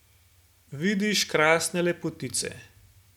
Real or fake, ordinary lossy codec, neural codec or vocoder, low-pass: real; none; none; 19.8 kHz